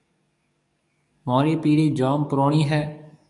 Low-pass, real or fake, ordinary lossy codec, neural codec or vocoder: 10.8 kHz; fake; MP3, 96 kbps; codec, 44.1 kHz, 7.8 kbps, DAC